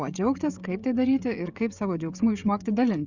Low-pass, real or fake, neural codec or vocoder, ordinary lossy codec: 7.2 kHz; fake; codec, 16 kHz, 16 kbps, FreqCodec, smaller model; Opus, 64 kbps